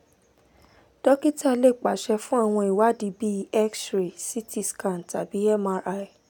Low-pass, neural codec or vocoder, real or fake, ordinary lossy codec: 19.8 kHz; none; real; none